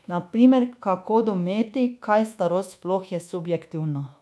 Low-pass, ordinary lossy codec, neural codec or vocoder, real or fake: none; none; codec, 24 kHz, 1.2 kbps, DualCodec; fake